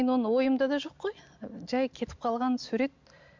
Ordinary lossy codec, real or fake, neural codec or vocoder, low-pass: MP3, 64 kbps; real; none; 7.2 kHz